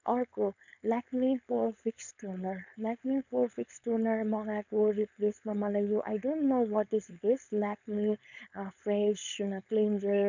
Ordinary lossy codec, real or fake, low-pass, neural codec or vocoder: none; fake; 7.2 kHz; codec, 16 kHz, 4.8 kbps, FACodec